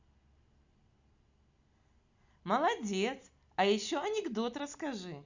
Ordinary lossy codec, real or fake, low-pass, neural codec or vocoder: Opus, 64 kbps; real; 7.2 kHz; none